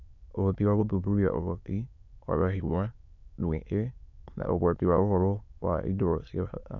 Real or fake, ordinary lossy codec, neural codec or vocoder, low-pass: fake; none; autoencoder, 22.05 kHz, a latent of 192 numbers a frame, VITS, trained on many speakers; 7.2 kHz